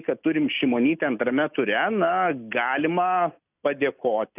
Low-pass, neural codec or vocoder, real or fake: 3.6 kHz; none; real